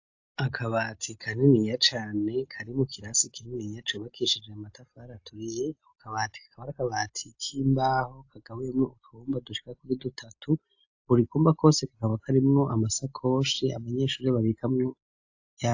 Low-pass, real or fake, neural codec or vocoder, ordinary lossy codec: 7.2 kHz; real; none; AAC, 48 kbps